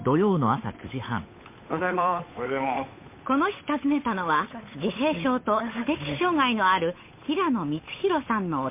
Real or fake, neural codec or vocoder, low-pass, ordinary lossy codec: fake; codec, 16 kHz, 8 kbps, FunCodec, trained on Chinese and English, 25 frames a second; 3.6 kHz; MP3, 24 kbps